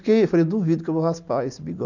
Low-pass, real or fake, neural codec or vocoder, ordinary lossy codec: 7.2 kHz; real; none; none